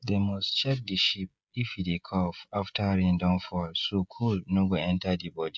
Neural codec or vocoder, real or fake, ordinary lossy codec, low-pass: codec, 16 kHz, 16 kbps, FreqCodec, smaller model; fake; none; none